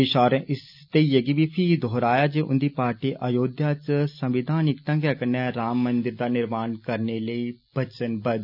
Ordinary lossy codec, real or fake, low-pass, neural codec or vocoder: none; real; 5.4 kHz; none